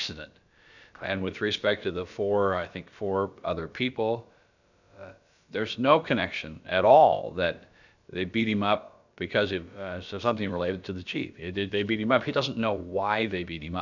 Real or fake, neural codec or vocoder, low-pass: fake; codec, 16 kHz, about 1 kbps, DyCAST, with the encoder's durations; 7.2 kHz